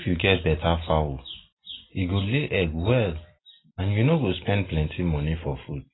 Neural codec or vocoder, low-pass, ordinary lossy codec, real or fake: none; 7.2 kHz; AAC, 16 kbps; real